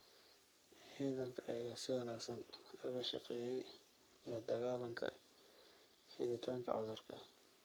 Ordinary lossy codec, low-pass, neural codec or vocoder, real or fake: none; none; codec, 44.1 kHz, 3.4 kbps, Pupu-Codec; fake